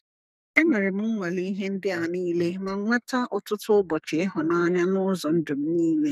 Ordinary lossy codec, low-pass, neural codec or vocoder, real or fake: none; 14.4 kHz; codec, 44.1 kHz, 2.6 kbps, SNAC; fake